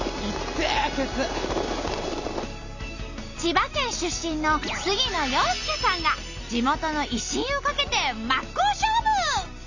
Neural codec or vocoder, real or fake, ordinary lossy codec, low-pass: none; real; none; 7.2 kHz